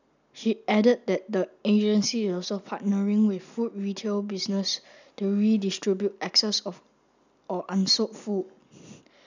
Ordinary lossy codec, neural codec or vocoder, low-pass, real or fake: none; none; 7.2 kHz; real